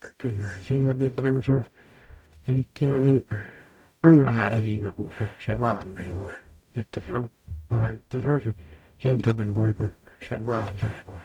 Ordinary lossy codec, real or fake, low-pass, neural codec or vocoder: none; fake; 19.8 kHz; codec, 44.1 kHz, 0.9 kbps, DAC